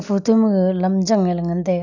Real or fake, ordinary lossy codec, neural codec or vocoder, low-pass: real; none; none; 7.2 kHz